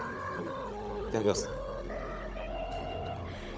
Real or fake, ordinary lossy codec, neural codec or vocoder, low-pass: fake; none; codec, 16 kHz, 16 kbps, FunCodec, trained on Chinese and English, 50 frames a second; none